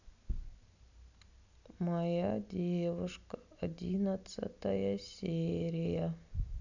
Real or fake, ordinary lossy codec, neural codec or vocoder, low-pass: real; none; none; 7.2 kHz